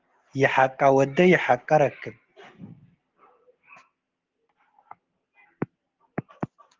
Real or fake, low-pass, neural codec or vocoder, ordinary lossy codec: real; 7.2 kHz; none; Opus, 16 kbps